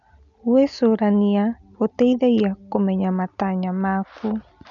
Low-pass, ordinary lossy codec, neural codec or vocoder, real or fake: 7.2 kHz; MP3, 96 kbps; none; real